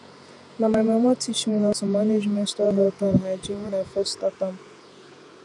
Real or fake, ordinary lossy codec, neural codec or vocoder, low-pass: fake; none; vocoder, 48 kHz, 128 mel bands, Vocos; 10.8 kHz